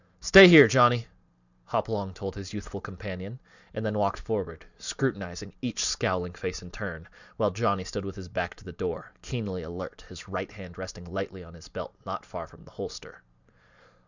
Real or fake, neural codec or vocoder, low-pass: fake; vocoder, 44.1 kHz, 128 mel bands every 512 samples, BigVGAN v2; 7.2 kHz